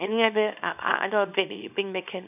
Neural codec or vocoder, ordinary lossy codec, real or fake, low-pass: codec, 24 kHz, 0.9 kbps, WavTokenizer, small release; none; fake; 3.6 kHz